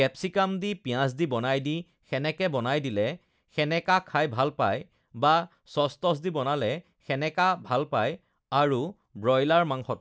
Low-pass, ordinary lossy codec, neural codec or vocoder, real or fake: none; none; none; real